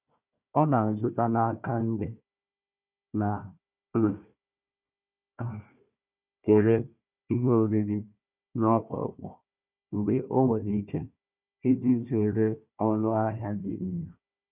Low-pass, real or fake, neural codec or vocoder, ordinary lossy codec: 3.6 kHz; fake; codec, 16 kHz, 1 kbps, FunCodec, trained on Chinese and English, 50 frames a second; none